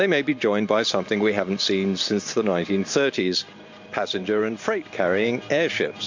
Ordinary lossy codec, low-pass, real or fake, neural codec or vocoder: MP3, 48 kbps; 7.2 kHz; fake; vocoder, 44.1 kHz, 128 mel bands every 512 samples, BigVGAN v2